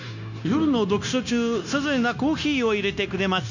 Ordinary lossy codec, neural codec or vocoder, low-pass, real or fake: none; codec, 16 kHz, 0.9 kbps, LongCat-Audio-Codec; 7.2 kHz; fake